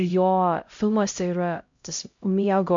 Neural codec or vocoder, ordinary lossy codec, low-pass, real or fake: codec, 16 kHz, 0.5 kbps, X-Codec, HuBERT features, trained on LibriSpeech; MP3, 48 kbps; 7.2 kHz; fake